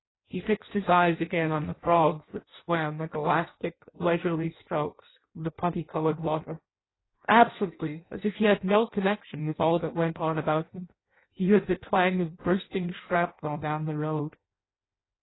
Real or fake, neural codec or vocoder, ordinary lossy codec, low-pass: fake; codec, 16 kHz in and 24 kHz out, 0.6 kbps, FireRedTTS-2 codec; AAC, 16 kbps; 7.2 kHz